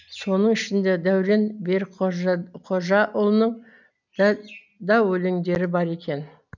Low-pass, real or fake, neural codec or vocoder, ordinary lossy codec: 7.2 kHz; real; none; none